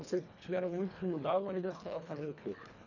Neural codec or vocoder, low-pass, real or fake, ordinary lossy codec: codec, 24 kHz, 1.5 kbps, HILCodec; 7.2 kHz; fake; none